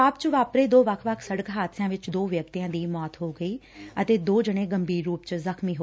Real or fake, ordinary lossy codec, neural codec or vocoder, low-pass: real; none; none; none